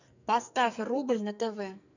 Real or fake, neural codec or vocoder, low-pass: fake; codec, 44.1 kHz, 2.6 kbps, SNAC; 7.2 kHz